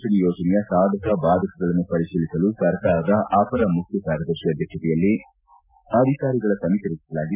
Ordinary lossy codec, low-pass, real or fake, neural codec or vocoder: none; 3.6 kHz; real; none